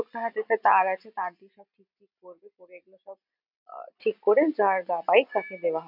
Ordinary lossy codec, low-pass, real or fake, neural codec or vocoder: none; 5.4 kHz; fake; vocoder, 44.1 kHz, 128 mel bands every 256 samples, BigVGAN v2